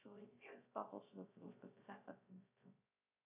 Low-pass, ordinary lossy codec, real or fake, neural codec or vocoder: 3.6 kHz; AAC, 32 kbps; fake; codec, 16 kHz, 0.3 kbps, FocalCodec